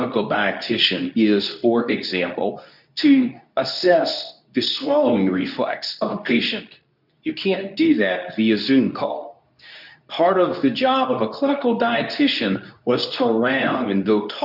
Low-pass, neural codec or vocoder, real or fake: 5.4 kHz; codec, 24 kHz, 0.9 kbps, WavTokenizer, medium speech release version 2; fake